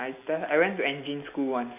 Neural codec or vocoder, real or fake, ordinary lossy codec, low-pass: none; real; AAC, 32 kbps; 3.6 kHz